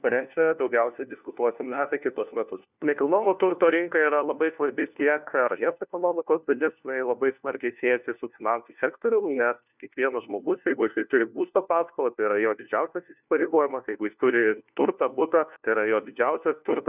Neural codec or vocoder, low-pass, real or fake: codec, 16 kHz, 1 kbps, FunCodec, trained on LibriTTS, 50 frames a second; 3.6 kHz; fake